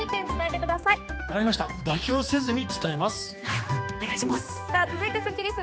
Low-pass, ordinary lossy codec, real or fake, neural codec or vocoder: none; none; fake; codec, 16 kHz, 2 kbps, X-Codec, HuBERT features, trained on general audio